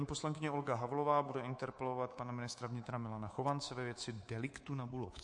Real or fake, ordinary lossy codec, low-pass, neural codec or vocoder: fake; MP3, 48 kbps; 10.8 kHz; codec, 24 kHz, 3.1 kbps, DualCodec